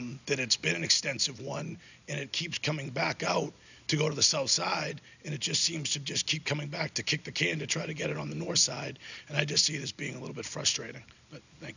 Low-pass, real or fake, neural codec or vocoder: 7.2 kHz; real; none